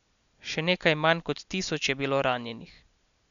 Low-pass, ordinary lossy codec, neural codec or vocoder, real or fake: 7.2 kHz; none; none; real